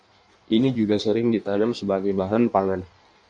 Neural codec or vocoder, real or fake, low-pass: codec, 16 kHz in and 24 kHz out, 1.1 kbps, FireRedTTS-2 codec; fake; 9.9 kHz